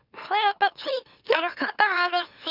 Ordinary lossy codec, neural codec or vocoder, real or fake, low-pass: none; autoencoder, 44.1 kHz, a latent of 192 numbers a frame, MeloTTS; fake; 5.4 kHz